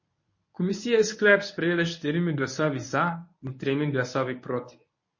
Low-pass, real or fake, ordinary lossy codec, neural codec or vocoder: 7.2 kHz; fake; MP3, 32 kbps; codec, 24 kHz, 0.9 kbps, WavTokenizer, medium speech release version 1